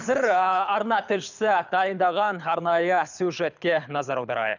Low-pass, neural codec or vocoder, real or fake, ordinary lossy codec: 7.2 kHz; codec, 24 kHz, 6 kbps, HILCodec; fake; none